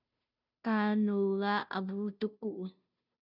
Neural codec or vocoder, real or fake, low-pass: codec, 16 kHz, 0.5 kbps, FunCodec, trained on Chinese and English, 25 frames a second; fake; 5.4 kHz